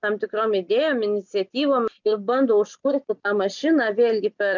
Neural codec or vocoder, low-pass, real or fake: none; 7.2 kHz; real